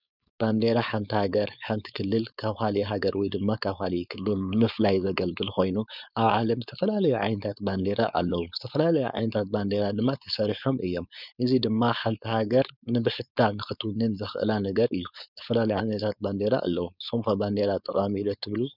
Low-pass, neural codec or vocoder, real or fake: 5.4 kHz; codec, 16 kHz, 4.8 kbps, FACodec; fake